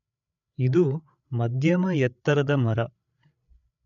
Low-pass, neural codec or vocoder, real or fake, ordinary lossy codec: 7.2 kHz; codec, 16 kHz, 8 kbps, FreqCodec, larger model; fake; none